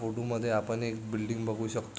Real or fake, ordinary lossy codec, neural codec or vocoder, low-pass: real; none; none; none